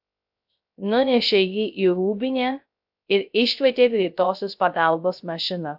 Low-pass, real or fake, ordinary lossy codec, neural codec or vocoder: 5.4 kHz; fake; AAC, 48 kbps; codec, 16 kHz, 0.3 kbps, FocalCodec